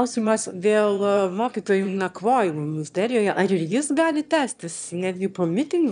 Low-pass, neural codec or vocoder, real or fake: 9.9 kHz; autoencoder, 22.05 kHz, a latent of 192 numbers a frame, VITS, trained on one speaker; fake